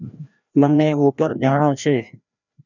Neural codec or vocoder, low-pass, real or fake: codec, 16 kHz, 1 kbps, FreqCodec, larger model; 7.2 kHz; fake